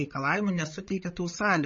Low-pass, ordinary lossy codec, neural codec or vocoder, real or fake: 7.2 kHz; MP3, 32 kbps; codec, 16 kHz, 16 kbps, FreqCodec, larger model; fake